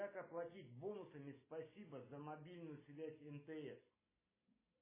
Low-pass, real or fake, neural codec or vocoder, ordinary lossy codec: 3.6 kHz; real; none; MP3, 16 kbps